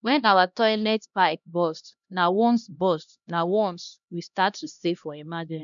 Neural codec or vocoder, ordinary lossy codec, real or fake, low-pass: codec, 16 kHz, 1 kbps, X-Codec, HuBERT features, trained on LibriSpeech; none; fake; 7.2 kHz